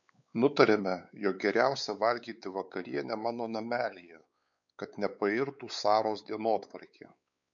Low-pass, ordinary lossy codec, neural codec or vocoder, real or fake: 7.2 kHz; MP3, 96 kbps; codec, 16 kHz, 4 kbps, X-Codec, WavLM features, trained on Multilingual LibriSpeech; fake